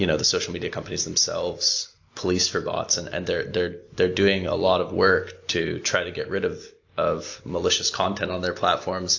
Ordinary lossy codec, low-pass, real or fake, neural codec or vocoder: AAC, 48 kbps; 7.2 kHz; real; none